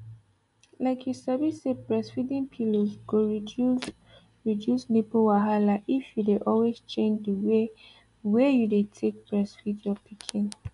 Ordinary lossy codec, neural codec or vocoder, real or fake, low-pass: MP3, 96 kbps; none; real; 10.8 kHz